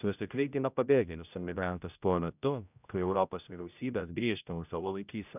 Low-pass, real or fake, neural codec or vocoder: 3.6 kHz; fake; codec, 16 kHz, 0.5 kbps, X-Codec, HuBERT features, trained on general audio